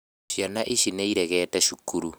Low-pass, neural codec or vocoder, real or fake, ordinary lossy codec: none; none; real; none